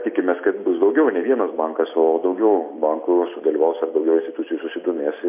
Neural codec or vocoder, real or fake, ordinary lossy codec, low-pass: none; real; MP3, 24 kbps; 3.6 kHz